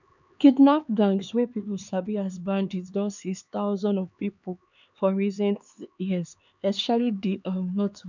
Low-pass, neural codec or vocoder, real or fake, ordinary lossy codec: 7.2 kHz; codec, 16 kHz, 4 kbps, X-Codec, HuBERT features, trained on LibriSpeech; fake; none